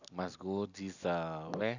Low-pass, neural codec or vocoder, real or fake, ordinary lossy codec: 7.2 kHz; none; real; none